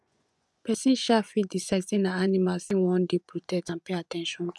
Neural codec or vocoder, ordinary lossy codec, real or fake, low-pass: vocoder, 24 kHz, 100 mel bands, Vocos; none; fake; none